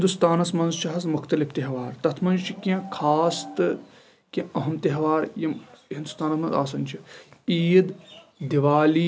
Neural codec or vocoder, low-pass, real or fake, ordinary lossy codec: none; none; real; none